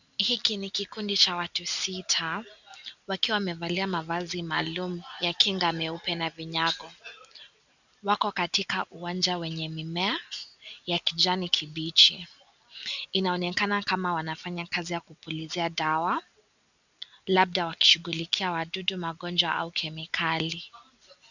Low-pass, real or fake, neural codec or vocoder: 7.2 kHz; real; none